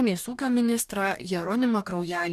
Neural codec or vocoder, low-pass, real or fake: codec, 44.1 kHz, 2.6 kbps, DAC; 14.4 kHz; fake